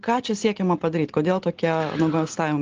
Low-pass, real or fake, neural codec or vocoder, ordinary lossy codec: 7.2 kHz; real; none; Opus, 16 kbps